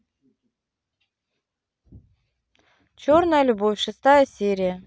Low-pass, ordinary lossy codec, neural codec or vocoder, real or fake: none; none; none; real